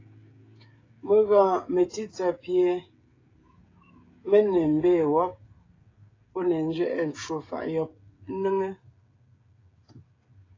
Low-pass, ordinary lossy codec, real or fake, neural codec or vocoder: 7.2 kHz; AAC, 32 kbps; fake; codec, 16 kHz, 16 kbps, FreqCodec, smaller model